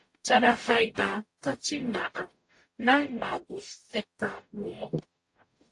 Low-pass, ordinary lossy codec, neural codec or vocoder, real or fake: 10.8 kHz; AAC, 48 kbps; codec, 44.1 kHz, 0.9 kbps, DAC; fake